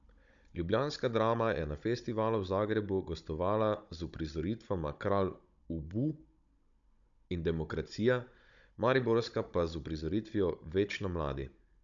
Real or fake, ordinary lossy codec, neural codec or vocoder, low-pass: fake; none; codec, 16 kHz, 16 kbps, FunCodec, trained on Chinese and English, 50 frames a second; 7.2 kHz